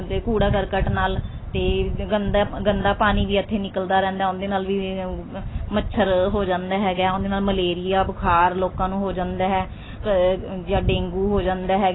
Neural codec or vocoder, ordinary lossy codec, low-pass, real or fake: none; AAC, 16 kbps; 7.2 kHz; real